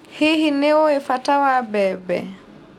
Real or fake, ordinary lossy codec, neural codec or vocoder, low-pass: real; none; none; 19.8 kHz